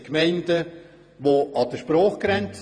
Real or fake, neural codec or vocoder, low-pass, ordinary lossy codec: fake; vocoder, 48 kHz, 128 mel bands, Vocos; 9.9 kHz; none